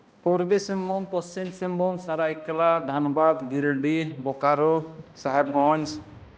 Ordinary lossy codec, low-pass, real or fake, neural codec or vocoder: none; none; fake; codec, 16 kHz, 1 kbps, X-Codec, HuBERT features, trained on balanced general audio